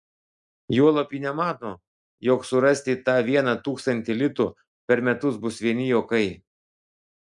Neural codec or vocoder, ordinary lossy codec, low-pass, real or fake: none; MP3, 96 kbps; 10.8 kHz; real